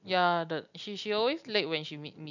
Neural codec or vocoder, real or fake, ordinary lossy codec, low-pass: none; real; none; 7.2 kHz